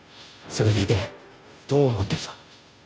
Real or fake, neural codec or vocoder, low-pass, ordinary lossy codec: fake; codec, 16 kHz, 0.5 kbps, FunCodec, trained on Chinese and English, 25 frames a second; none; none